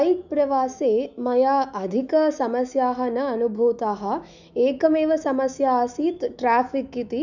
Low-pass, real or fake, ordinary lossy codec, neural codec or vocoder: 7.2 kHz; fake; none; autoencoder, 48 kHz, 128 numbers a frame, DAC-VAE, trained on Japanese speech